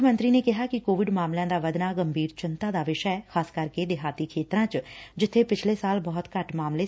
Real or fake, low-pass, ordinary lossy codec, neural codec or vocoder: real; none; none; none